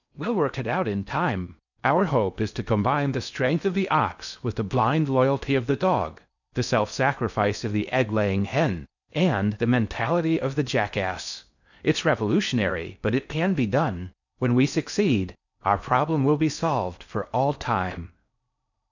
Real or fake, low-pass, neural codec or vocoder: fake; 7.2 kHz; codec, 16 kHz in and 24 kHz out, 0.6 kbps, FocalCodec, streaming, 4096 codes